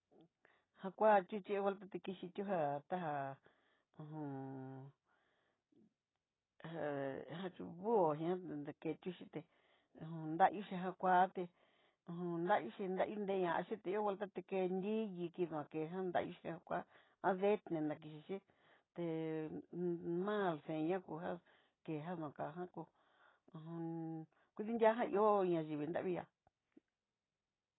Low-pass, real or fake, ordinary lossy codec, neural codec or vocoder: 7.2 kHz; real; AAC, 16 kbps; none